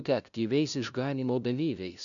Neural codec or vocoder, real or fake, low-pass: codec, 16 kHz, 0.5 kbps, FunCodec, trained on LibriTTS, 25 frames a second; fake; 7.2 kHz